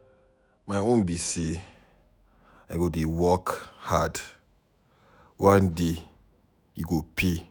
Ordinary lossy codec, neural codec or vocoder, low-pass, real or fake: none; autoencoder, 48 kHz, 128 numbers a frame, DAC-VAE, trained on Japanese speech; none; fake